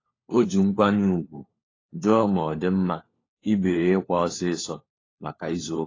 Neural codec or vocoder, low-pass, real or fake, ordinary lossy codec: codec, 16 kHz, 4 kbps, FunCodec, trained on LibriTTS, 50 frames a second; 7.2 kHz; fake; AAC, 32 kbps